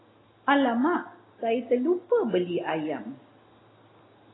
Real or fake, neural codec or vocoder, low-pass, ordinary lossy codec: real; none; 7.2 kHz; AAC, 16 kbps